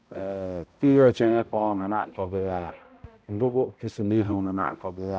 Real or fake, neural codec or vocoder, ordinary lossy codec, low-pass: fake; codec, 16 kHz, 0.5 kbps, X-Codec, HuBERT features, trained on balanced general audio; none; none